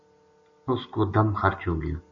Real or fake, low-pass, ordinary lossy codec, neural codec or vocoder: real; 7.2 kHz; AAC, 64 kbps; none